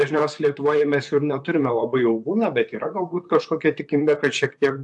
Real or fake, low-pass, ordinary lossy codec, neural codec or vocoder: fake; 10.8 kHz; AAC, 64 kbps; vocoder, 44.1 kHz, 128 mel bands, Pupu-Vocoder